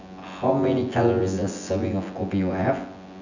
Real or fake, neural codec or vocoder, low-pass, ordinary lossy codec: fake; vocoder, 24 kHz, 100 mel bands, Vocos; 7.2 kHz; none